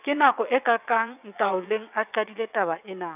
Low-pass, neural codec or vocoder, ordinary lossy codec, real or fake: 3.6 kHz; vocoder, 22.05 kHz, 80 mel bands, WaveNeXt; none; fake